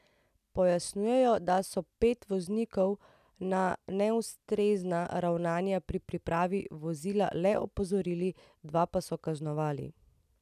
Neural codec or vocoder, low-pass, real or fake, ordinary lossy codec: none; 14.4 kHz; real; none